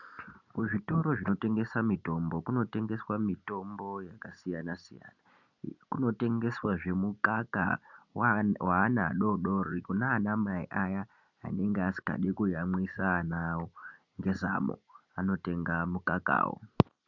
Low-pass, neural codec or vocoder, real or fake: 7.2 kHz; none; real